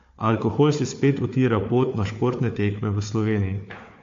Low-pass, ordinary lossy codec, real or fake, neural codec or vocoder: 7.2 kHz; AAC, 48 kbps; fake; codec, 16 kHz, 4 kbps, FunCodec, trained on Chinese and English, 50 frames a second